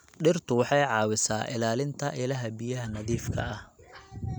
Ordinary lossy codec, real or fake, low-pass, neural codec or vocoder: none; real; none; none